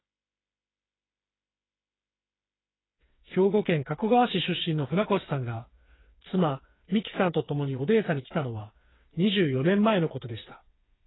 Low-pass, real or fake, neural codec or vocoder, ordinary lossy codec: 7.2 kHz; fake; codec, 16 kHz, 4 kbps, FreqCodec, smaller model; AAC, 16 kbps